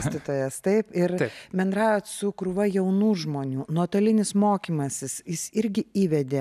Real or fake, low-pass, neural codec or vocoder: real; 14.4 kHz; none